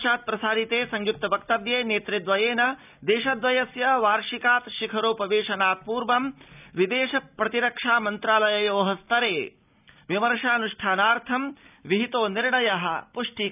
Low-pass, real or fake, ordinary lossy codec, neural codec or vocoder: 3.6 kHz; real; none; none